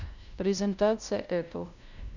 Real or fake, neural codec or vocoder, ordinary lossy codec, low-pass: fake; codec, 16 kHz, 0.5 kbps, FunCodec, trained on LibriTTS, 25 frames a second; none; 7.2 kHz